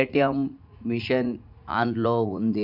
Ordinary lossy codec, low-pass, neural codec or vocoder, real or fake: none; 5.4 kHz; vocoder, 44.1 kHz, 80 mel bands, Vocos; fake